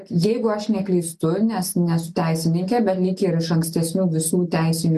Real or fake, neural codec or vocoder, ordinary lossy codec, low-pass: real; none; MP3, 96 kbps; 14.4 kHz